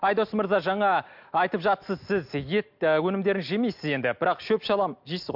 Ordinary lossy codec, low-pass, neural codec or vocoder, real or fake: MP3, 48 kbps; 5.4 kHz; none; real